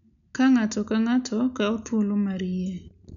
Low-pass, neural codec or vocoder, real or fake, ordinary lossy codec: 7.2 kHz; none; real; none